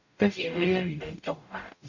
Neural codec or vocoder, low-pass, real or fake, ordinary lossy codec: codec, 44.1 kHz, 0.9 kbps, DAC; 7.2 kHz; fake; none